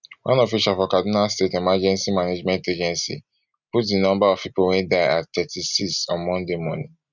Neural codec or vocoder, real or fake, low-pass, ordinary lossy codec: none; real; 7.2 kHz; none